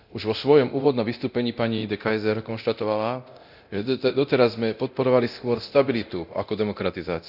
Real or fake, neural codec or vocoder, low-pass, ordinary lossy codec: fake; codec, 24 kHz, 0.9 kbps, DualCodec; 5.4 kHz; none